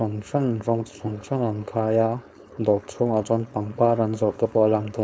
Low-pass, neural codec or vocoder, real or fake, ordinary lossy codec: none; codec, 16 kHz, 4.8 kbps, FACodec; fake; none